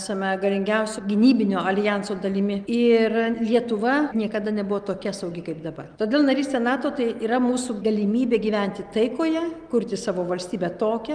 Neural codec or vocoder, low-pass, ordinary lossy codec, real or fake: none; 9.9 kHz; Opus, 32 kbps; real